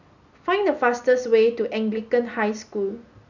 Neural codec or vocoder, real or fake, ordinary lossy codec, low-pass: none; real; none; 7.2 kHz